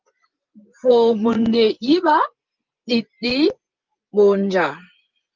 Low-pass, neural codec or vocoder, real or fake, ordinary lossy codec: 7.2 kHz; vocoder, 24 kHz, 100 mel bands, Vocos; fake; Opus, 24 kbps